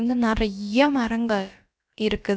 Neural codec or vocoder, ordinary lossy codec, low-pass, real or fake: codec, 16 kHz, about 1 kbps, DyCAST, with the encoder's durations; none; none; fake